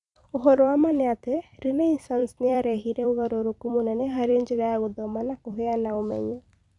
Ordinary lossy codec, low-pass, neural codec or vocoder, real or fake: none; 10.8 kHz; vocoder, 44.1 kHz, 128 mel bands every 256 samples, BigVGAN v2; fake